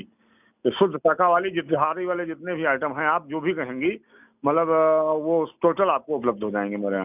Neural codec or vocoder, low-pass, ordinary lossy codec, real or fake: none; 3.6 kHz; none; real